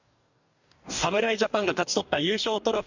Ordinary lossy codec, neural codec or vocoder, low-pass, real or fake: none; codec, 44.1 kHz, 2.6 kbps, DAC; 7.2 kHz; fake